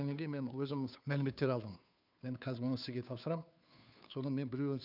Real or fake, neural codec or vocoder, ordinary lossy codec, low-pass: fake; codec, 16 kHz, 2 kbps, FunCodec, trained on Chinese and English, 25 frames a second; none; 5.4 kHz